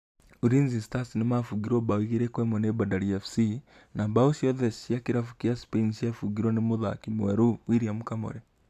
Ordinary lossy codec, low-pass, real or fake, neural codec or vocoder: MP3, 96 kbps; 14.4 kHz; real; none